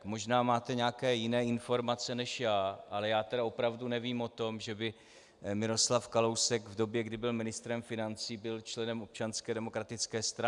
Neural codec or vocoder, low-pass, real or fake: none; 10.8 kHz; real